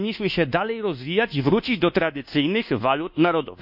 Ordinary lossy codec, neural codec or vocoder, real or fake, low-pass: none; codec, 24 kHz, 1.2 kbps, DualCodec; fake; 5.4 kHz